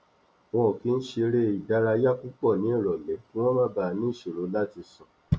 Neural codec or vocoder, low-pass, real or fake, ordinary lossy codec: none; none; real; none